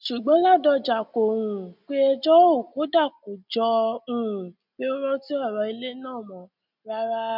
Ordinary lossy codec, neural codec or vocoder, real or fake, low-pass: none; none; real; 5.4 kHz